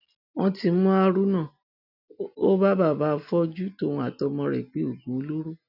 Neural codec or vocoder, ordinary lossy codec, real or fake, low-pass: none; none; real; 5.4 kHz